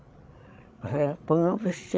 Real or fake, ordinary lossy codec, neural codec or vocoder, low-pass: fake; none; codec, 16 kHz, 16 kbps, FreqCodec, larger model; none